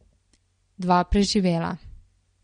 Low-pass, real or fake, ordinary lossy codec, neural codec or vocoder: 9.9 kHz; real; MP3, 48 kbps; none